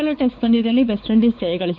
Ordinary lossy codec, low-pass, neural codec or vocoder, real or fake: none; none; codec, 16 kHz, 4 kbps, X-Codec, WavLM features, trained on Multilingual LibriSpeech; fake